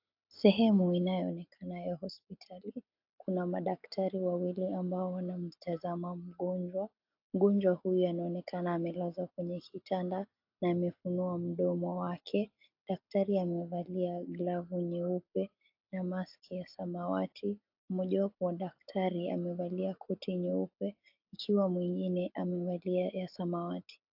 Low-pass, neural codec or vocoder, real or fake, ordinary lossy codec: 5.4 kHz; none; real; AAC, 48 kbps